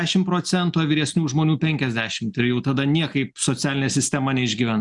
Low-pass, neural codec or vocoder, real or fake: 10.8 kHz; none; real